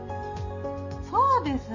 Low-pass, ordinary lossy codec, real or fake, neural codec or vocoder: 7.2 kHz; none; real; none